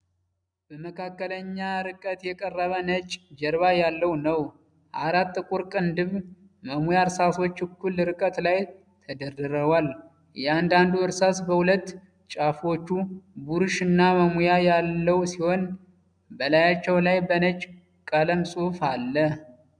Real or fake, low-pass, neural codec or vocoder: real; 9.9 kHz; none